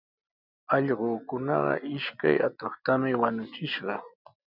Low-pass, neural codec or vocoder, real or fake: 5.4 kHz; none; real